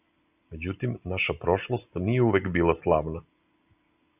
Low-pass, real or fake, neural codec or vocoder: 3.6 kHz; real; none